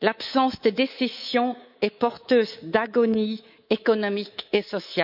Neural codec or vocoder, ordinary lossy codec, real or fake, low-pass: codec, 24 kHz, 3.1 kbps, DualCodec; none; fake; 5.4 kHz